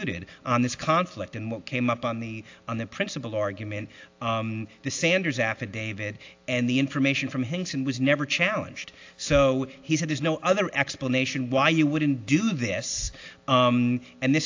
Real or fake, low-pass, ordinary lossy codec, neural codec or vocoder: real; 7.2 kHz; AAC, 48 kbps; none